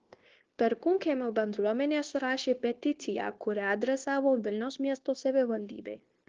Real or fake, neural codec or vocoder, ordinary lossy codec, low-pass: fake; codec, 16 kHz, 0.9 kbps, LongCat-Audio-Codec; Opus, 16 kbps; 7.2 kHz